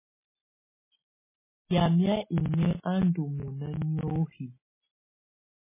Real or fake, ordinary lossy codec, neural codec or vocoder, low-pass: real; MP3, 16 kbps; none; 3.6 kHz